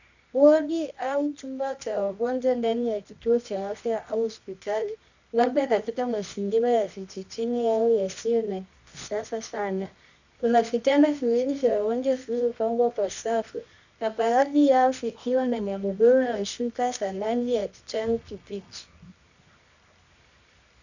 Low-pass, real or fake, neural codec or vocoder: 7.2 kHz; fake; codec, 24 kHz, 0.9 kbps, WavTokenizer, medium music audio release